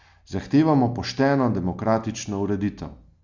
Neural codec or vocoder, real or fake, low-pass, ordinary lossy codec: none; real; 7.2 kHz; Opus, 64 kbps